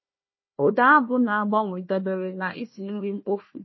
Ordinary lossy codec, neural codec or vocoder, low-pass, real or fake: MP3, 24 kbps; codec, 16 kHz, 1 kbps, FunCodec, trained on Chinese and English, 50 frames a second; 7.2 kHz; fake